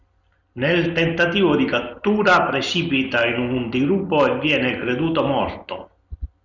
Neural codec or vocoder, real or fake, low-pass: none; real; 7.2 kHz